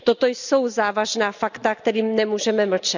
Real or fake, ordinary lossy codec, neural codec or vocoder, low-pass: real; none; none; 7.2 kHz